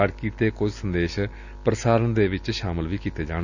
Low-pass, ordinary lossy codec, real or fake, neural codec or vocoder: 7.2 kHz; none; real; none